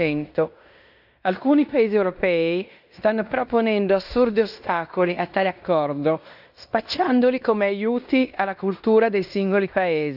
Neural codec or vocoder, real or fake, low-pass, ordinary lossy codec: codec, 16 kHz in and 24 kHz out, 0.9 kbps, LongCat-Audio-Codec, fine tuned four codebook decoder; fake; 5.4 kHz; none